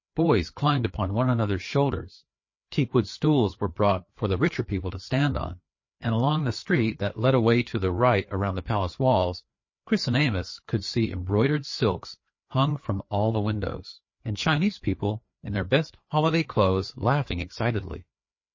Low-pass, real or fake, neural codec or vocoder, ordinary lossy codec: 7.2 kHz; fake; codec, 16 kHz, 4 kbps, FreqCodec, larger model; MP3, 32 kbps